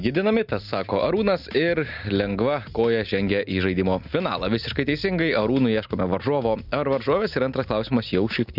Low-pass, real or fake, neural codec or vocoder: 5.4 kHz; real; none